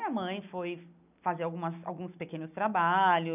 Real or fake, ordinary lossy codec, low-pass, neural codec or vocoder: real; none; 3.6 kHz; none